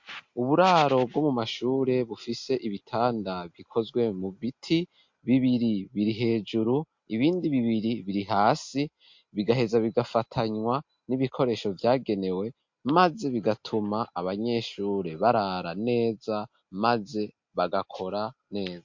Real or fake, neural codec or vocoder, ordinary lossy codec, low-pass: real; none; MP3, 48 kbps; 7.2 kHz